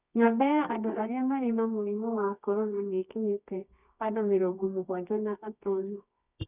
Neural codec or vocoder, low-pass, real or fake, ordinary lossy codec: codec, 24 kHz, 0.9 kbps, WavTokenizer, medium music audio release; 3.6 kHz; fake; none